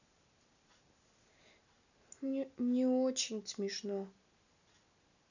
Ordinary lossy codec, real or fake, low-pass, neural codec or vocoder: none; real; 7.2 kHz; none